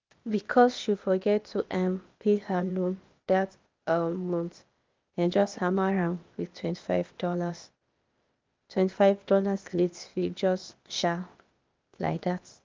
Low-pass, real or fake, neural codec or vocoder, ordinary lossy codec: 7.2 kHz; fake; codec, 16 kHz, 0.8 kbps, ZipCodec; Opus, 24 kbps